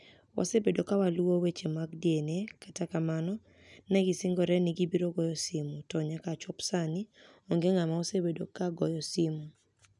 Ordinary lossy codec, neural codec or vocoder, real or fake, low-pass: none; none; real; 10.8 kHz